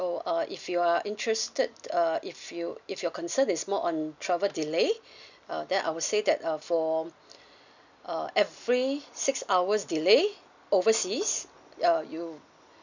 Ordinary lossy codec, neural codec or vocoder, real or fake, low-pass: none; none; real; 7.2 kHz